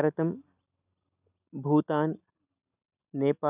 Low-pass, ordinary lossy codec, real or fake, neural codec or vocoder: 3.6 kHz; none; real; none